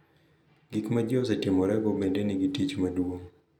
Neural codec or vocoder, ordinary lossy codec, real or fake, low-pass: none; none; real; 19.8 kHz